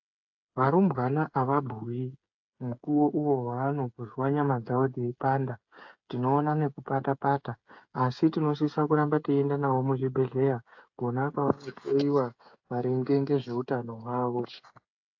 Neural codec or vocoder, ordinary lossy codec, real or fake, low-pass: codec, 16 kHz, 8 kbps, FreqCodec, smaller model; AAC, 48 kbps; fake; 7.2 kHz